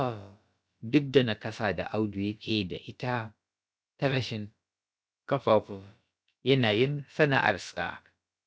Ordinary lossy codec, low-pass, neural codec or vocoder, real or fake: none; none; codec, 16 kHz, about 1 kbps, DyCAST, with the encoder's durations; fake